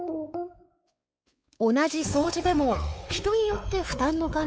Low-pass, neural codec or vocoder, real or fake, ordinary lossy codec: none; codec, 16 kHz, 4 kbps, X-Codec, WavLM features, trained on Multilingual LibriSpeech; fake; none